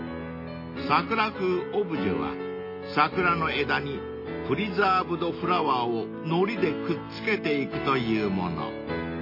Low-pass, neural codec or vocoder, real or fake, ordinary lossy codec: 5.4 kHz; none; real; MP3, 24 kbps